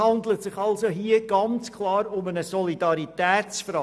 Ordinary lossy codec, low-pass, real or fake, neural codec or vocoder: none; none; real; none